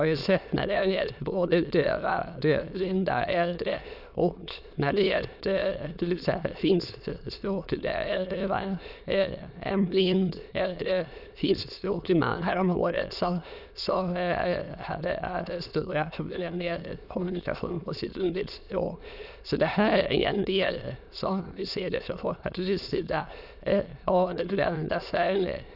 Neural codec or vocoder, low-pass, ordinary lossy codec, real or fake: autoencoder, 22.05 kHz, a latent of 192 numbers a frame, VITS, trained on many speakers; 5.4 kHz; none; fake